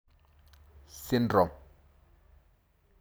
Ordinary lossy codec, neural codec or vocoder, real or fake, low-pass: none; none; real; none